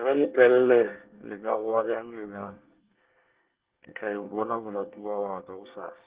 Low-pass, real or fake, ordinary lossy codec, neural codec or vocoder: 3.6 kHz; fake; Opus, 16 kbps; codec, 24 kHz, 1 kbps, SNAC